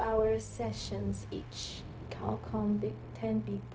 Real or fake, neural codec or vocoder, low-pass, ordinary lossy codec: fake; codec, 16 kHz, 0.4 kbps, LongCat-Audio-Codec; none; none